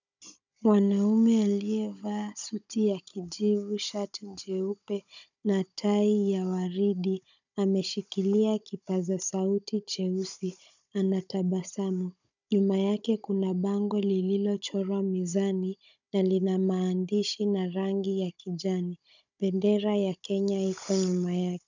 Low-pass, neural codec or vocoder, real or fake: 7.2 kHz; codec, 16 kHz, 16 kbps, FunCodec, trained on Chinese and English, 50 frames a second; fake